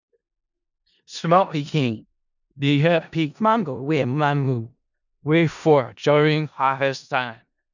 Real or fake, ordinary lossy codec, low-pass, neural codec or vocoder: fake; none; 7.2 kHz; codec, 16 kHz in and 24 kHz out, 0.4 kbps, LongCat-Audio-Codec, four codebook decoder